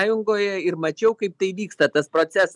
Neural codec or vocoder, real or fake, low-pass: none; real; 10.8 kHz